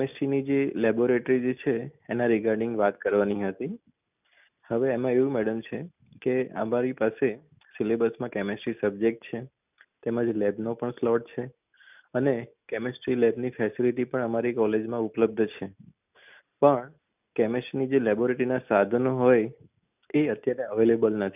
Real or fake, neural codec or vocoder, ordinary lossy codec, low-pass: real; none; none; 3.6 kHz